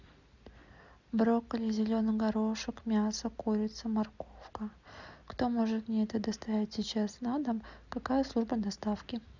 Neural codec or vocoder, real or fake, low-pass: none; real; 7.2 kHz